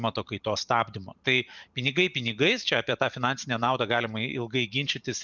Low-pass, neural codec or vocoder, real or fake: 7.2 kHz; vocoder, 44.1 kHz, 128 mel bands every 512 samples, BigVGAN v2; fake